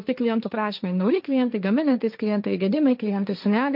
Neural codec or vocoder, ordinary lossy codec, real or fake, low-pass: codec, 16 kHz, 1.1 kbps, Voila-Tokenizer; MP3, 48 kbps; fake; 5.4 kHz